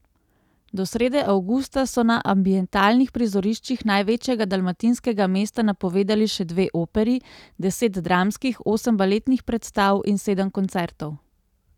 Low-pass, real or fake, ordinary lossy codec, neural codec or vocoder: 19.8 kHz; real; none; none